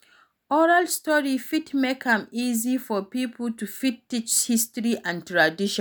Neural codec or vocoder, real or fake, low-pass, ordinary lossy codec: none; real; none; none